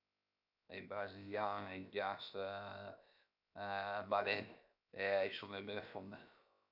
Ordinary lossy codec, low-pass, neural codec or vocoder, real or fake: none; 5.4 kHz; codec, 16 kHz, 0.7 kbps, FocalCodec; fake